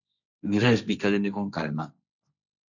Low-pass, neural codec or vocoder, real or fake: 7.2 kHz; codec, 16 kHz, 1.1 kbps, Voila-Tokenizer; fake